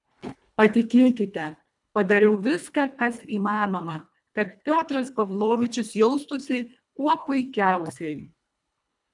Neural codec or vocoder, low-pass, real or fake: codec, 24 kHz, 1.5 kbps, HILCodec; 10.8 kHz; fake